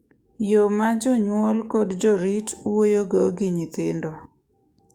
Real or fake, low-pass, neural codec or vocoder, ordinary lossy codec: fake; 19.8 kHz; codec, 44.1 kHz, 7.8 kbps, DAC; Opus, 64 kbps